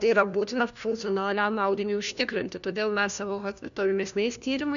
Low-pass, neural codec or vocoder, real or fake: 7.2 kHz; codec, 16 kHz, 1 kbps, FunCodec, trained on LibriTTS, 50 frames a second; fake